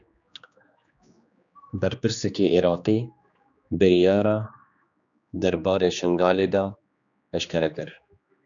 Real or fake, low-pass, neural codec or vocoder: fake; 7.2 kHz; codec, 16 kHz, 2 kbps, X-Codec, HuBERT features, trained on general audio